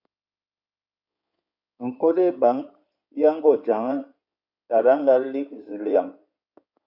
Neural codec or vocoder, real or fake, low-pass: codec, 16 kHz in and 24 kHz out, 2.2 kbps, FireRedTTS-2 codec; fake; 5.4 kHz